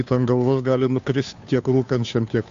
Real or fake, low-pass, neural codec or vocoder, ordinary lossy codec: fake; 7.2 kHz; codec, 16 kHz, 2 kbps, FunCodec, trained on Chinese and English, 25 frames a second; AAC, 64 kbps